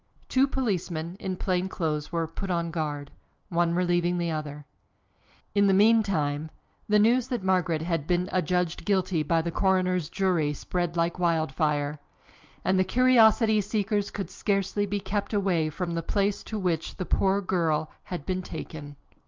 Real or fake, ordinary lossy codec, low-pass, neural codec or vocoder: real; Opus, 24 kbps; 7.2 kHz; none